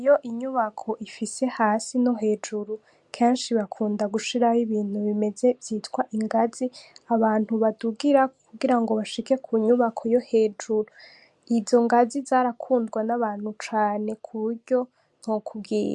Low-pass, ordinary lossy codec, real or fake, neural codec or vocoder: 10.8 kHz; MP3, 64 kbps; real; none